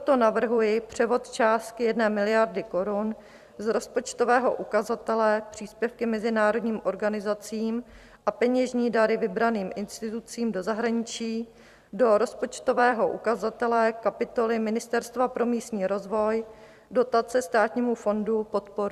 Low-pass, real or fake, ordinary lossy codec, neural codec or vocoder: 14.4 kHz; fake; Opus, 64 kbps; vocoder, 44.1 kHz, 128 mel bands every 256 samples, BigVGAN v2